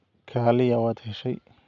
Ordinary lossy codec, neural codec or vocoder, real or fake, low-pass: none; none; real; 7.2 kHz